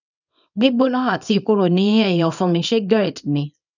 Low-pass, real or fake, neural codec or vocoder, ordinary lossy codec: 7.2 kHz; fake; codec, 24 kHz, 0.9 kbps, WavTokenizer, small release; none